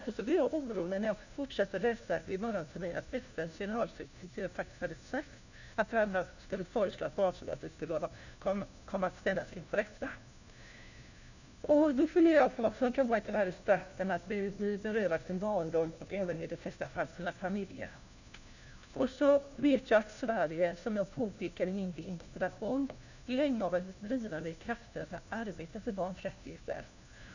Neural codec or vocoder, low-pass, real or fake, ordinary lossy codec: codec, 16 kHz, 1 kbps, FunCodec, trained on LibriTTS, 50 frames a second; 7.2 kHz; fake; none